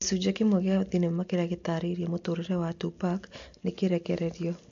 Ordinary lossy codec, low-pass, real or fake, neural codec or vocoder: AAC, 48 kbps; 7.2 kHz; real; none